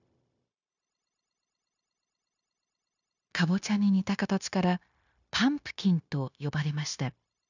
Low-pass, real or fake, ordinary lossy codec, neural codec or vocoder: 7.2 kHz; fake; none; codec, 16 kHz, 0.9 kbps, LongCat-Audio-Codec